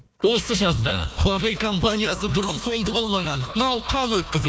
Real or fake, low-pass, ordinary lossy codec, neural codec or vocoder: fake; none; none; codec, 16 kHz, 1 kbps, FunCodec, trained on Chinese and English, 50 frames a second